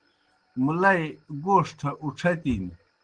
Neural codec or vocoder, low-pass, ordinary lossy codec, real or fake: none; 9.9 kHz; Opus, 16 kbps; real